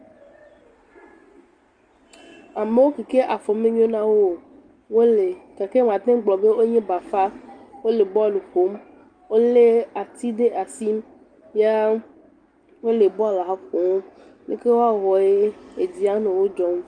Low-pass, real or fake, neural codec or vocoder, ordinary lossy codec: 9.9 kHz; real; none; Opus, 32 kbps